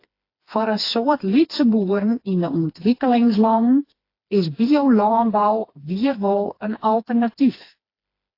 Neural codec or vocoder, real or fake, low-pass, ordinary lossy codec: codec, 16 kHz, 2 kbps, FreqCodec, smaller model; fake; 5.4 kHz; AAC, 32 kbps